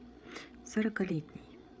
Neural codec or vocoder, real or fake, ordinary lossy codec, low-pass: codec, 16 kHz, 8 kbps, FreqCodec, larger model; fake; none; none